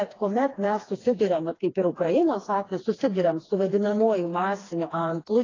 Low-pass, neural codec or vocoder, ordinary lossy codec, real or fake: 7.2 kHz; codec, 16 kHz, 2 kbps, FreqCodec, smaller model; AAC, 32 kbps; fake